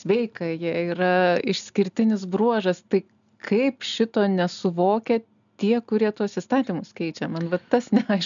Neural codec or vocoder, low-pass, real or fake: none; 7.2 kHz; real